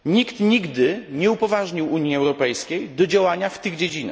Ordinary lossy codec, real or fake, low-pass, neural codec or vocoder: none; real; none; none